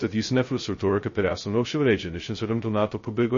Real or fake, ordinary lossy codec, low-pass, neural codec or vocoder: fake; MP3, 32 kbps; 7.2 kHz; codec, 16 kHz, 0.2 kbps, FocalCodec